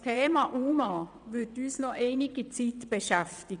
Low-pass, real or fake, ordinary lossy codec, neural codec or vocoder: 9.9 kHz; fake; none; vocoder, 22.05 kHz, 80 mel bands, WaveNeXt